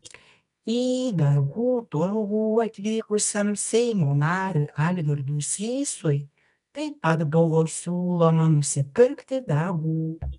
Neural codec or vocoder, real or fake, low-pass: codec, 24 kHz, 0.9 kbps, WavTokenizer, medium music audio release; fake; 10.8 kHz